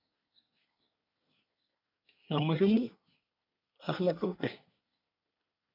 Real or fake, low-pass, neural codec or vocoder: fake; 5.4 kHz; codec, 24 kHz, 1 kbps, SNAC